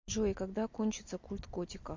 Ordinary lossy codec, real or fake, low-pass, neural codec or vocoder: MP3, 48 kbps; real; 7.2 kHz; none